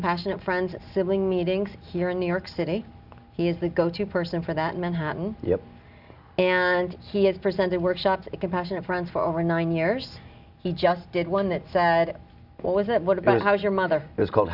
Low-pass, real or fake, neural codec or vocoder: 5.4 kHz; real; none